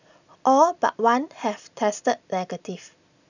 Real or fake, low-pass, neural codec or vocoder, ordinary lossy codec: real; 7.2 kHz; none; none